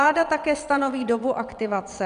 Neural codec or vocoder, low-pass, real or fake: vocoder, 22.05 kHz, 80 mel bands, WaveNeXt; 9.9 kHz; fake